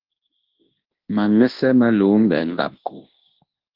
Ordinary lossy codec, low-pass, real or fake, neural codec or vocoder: Opus, 16 kbps; 5.4 kHz; fake; codec, 24 kHz, 0.9 kbps, WavTokenizer, large speech release